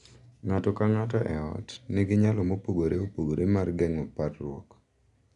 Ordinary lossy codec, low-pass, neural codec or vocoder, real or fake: none; 10.8 kHz; none; real